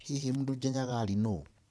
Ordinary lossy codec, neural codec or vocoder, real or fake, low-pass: none; vocoder, 22.05 kHz, 80 mel bands, Vocos; fake; none